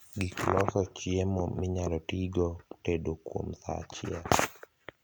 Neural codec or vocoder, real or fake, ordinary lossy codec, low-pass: none; real; none; none